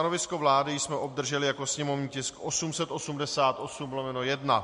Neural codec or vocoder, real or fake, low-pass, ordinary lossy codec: none; real; 10.8 kHz; MP3, 48 kbps